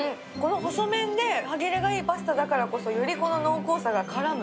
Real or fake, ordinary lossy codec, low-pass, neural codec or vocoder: real; none; none; none